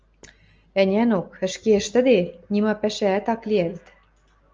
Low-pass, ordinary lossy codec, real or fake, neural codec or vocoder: 7.2 kHz; Opus, 24 kbps; real; none